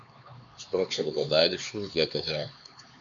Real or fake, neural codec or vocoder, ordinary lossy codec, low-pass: fake; codec, 16 kHz, 4 kbps, X-Codec, HuBERT features, trained on LibriSpeech; MP3, 64 kbps; 7.2 kHz